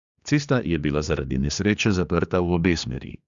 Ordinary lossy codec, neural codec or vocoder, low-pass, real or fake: Opus, 64 kbps; codec, 16 kHz, 2 kbps, X-Codec, HuBERT features, trained on general audio; 7.2 kHz; fake